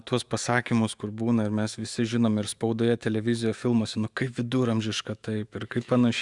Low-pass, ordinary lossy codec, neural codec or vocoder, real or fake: 10.8 kHz; Opus, 64 kbps; autoencoder, 48 kHz, 128 numbers a frame, DAC-VAE, trained on Japanese speech; fake